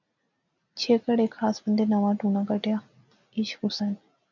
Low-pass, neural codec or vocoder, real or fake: 7.2 kHz; none; real